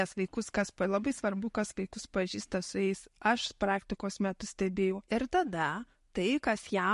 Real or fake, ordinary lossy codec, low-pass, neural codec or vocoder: real; MP3, 48 kbps; 14.4 kHz; none